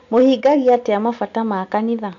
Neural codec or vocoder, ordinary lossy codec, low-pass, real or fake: none; AAC, 64 kbps; 7.2 kHz; real